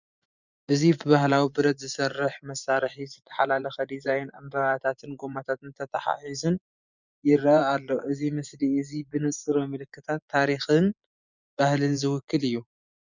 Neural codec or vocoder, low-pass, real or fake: vocoder, 24 kHz, 100 mel bands, Vocos; 7.2 kHz; fake